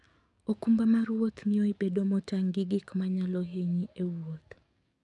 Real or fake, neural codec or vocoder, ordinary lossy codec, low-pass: fake; codec, 44.1 kHz, 7.8 kbps, DAC; none; 10.8 kHz